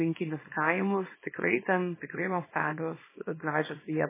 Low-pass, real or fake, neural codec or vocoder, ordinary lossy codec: 3.6 kHz; fake; codec, 24 kHz, 0.9 kbps, WavTokenizer, small release; MP3, 16 kbps